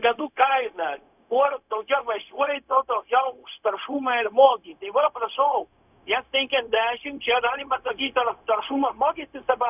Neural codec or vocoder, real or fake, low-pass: codec, 16 kHz, 0.4 kbps, LongCat-Audio-Codec; fake; 3.6 kHz